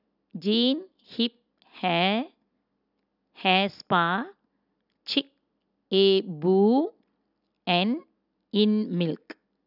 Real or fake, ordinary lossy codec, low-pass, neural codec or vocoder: real; none; 5.4 kHz; none